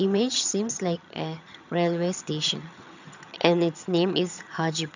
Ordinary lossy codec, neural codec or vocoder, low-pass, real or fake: none; vocoder, 22.05 kHz, 80 mel bands, HiFi-GAN; 7.2 kHz; fake